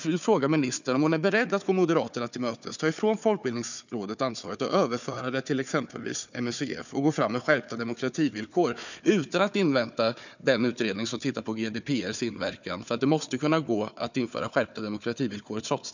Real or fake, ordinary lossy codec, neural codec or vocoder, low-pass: fake; none; codec, 16 kHz, 4 kbps, FunCodec, trained on Chinese and English, 50 frames a second; 7.2 kHz